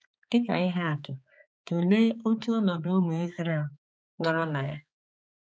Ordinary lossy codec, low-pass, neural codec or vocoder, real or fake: none; none; codec, 16 kHz, 4 kbps, X-Codec, HuBERT features, trained on balanced general audio; fake